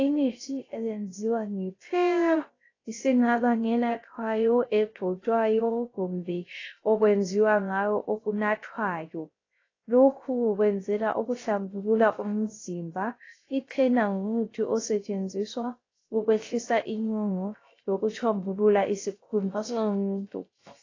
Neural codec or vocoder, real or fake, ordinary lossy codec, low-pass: codec, 16 kHz, 0.3 kbps, FocalCodec; fake; AAC, 32 kbps; 7.2 kHz